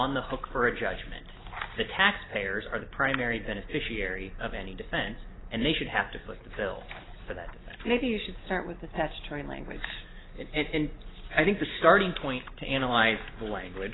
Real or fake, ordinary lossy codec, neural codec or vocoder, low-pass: real; AAC, 16 kbps; none; 7.2 kHz